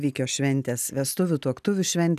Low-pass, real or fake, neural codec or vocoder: 14.4 kHz; real; none